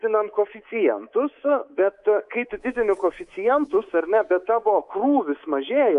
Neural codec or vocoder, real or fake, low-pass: codec, 24 kHz, 3.1 kbps, DualCodec; fake; 10.8 kHz